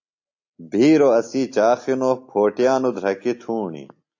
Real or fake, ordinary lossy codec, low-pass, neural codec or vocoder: real; AAC, 48 kbps; 7.2 kHz; none